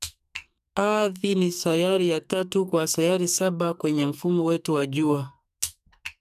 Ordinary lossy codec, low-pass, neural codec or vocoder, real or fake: none; 14.4 kHz; codec, 44.1 kHz, 2.6 kbps, SNAC; fake